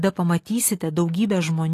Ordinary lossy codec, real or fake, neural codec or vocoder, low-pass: AAC, 48 kbps; real; none; 14.4 kHz